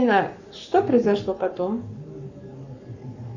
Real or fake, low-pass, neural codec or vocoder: fake; 7.2 kHz; codec, 44.1 kHz, 7.8 kbps, Pupu-Codec